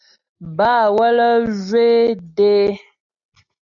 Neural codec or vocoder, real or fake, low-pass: none; real; 7.2 kHz